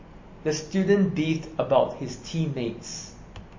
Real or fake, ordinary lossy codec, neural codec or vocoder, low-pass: real; MP3, 32 kbps; none; 7.2 kHz